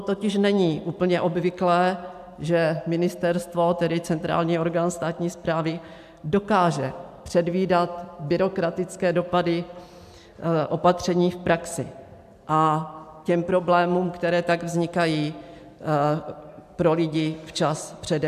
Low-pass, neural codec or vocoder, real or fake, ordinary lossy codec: 14.4 kHz; none; real; AAC, 96 kbps